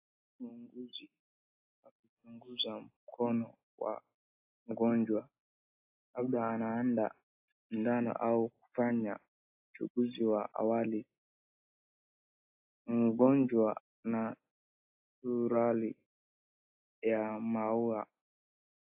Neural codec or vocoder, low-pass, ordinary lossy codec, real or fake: none; 3.6 kHz; AAC, 24 kbps; real